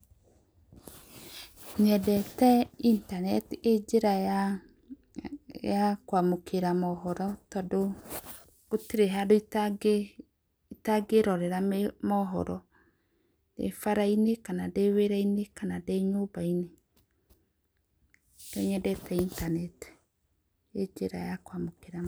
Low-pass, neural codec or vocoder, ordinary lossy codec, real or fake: none; none; none; real